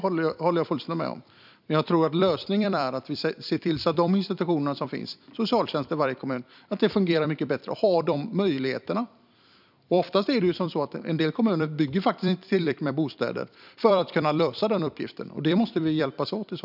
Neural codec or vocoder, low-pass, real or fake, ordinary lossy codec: vocoder, 44.1 kHz, 128 mel bands every 256 samples, BigVGAN v2; 5.4 kHz; fake; none